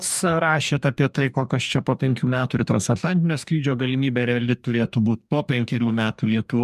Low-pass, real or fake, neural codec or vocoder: 14.4 kHz; fake; codec, 44.1 kHz, 2.6 kbps, DAC